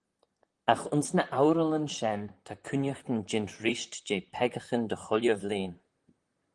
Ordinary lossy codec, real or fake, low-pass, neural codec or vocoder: Opus, 24 kbps; fake; 10.8 kHz; vocoder, 44.1 kHz, 128 mel bands, Pupu-Vocoder